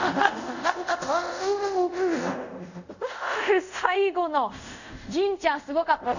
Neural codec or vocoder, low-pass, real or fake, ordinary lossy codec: codec, 24 kHz, 0.5 kbps, DualCodec; 7.2 kHz; fake; none